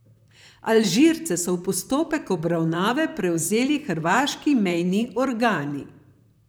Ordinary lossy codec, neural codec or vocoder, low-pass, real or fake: none; vocoder, 44.1 kHz, 128 mel bands, Pupu-Vocoder; none; fake